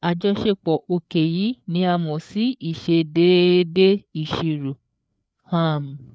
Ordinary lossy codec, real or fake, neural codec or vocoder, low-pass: none; fake; codec, 16 kHz, 4 kbps, FreqCodec, larger model; none